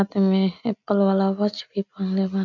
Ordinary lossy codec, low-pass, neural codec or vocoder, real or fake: none; 7.2 kHz; none; real